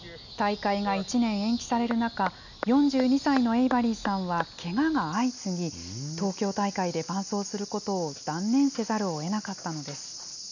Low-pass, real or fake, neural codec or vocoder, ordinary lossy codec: 7.2 kHz; real; none; none